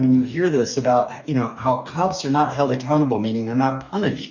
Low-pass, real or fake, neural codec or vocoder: 7.2 kHz; fake; codec, 44.1 kHz, 2.6 kbps, DAC